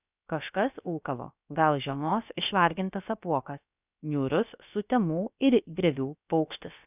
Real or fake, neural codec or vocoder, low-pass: fake; codec, 16 kHz, about 1 kbps, DyCAST, with the encoder's durations; 3.6 kHz